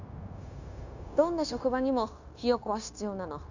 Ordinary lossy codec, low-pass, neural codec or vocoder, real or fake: none; 7.2 kHz; codec, 16 kHz, 0.9 kbps, LongCat-Audio-Codec; fake